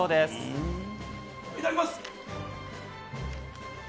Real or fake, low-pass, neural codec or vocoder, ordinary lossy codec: real; none; none; none